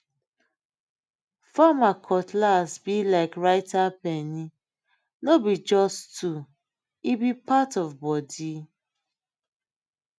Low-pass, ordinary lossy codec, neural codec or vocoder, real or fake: none; none; none; real